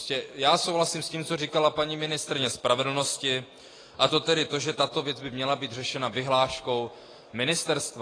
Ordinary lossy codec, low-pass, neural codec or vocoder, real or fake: AAC, 32 kbps; 9.9 kHz; none; real